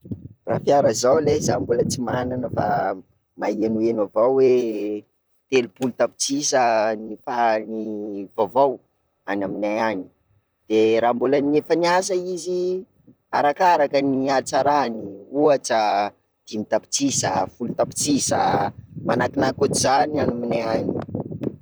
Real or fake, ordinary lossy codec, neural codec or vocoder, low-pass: fake; none; vocoder, 44.1 kHz, 128 mel bands, Pupu-Vocoder; none